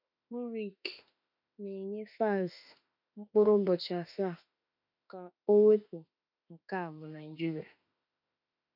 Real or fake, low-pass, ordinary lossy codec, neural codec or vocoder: fake; 5.4 kHz; none; autoencoder, 48 kHz, 32 numbers a frame, DAC-VAE, trained on Japanese speech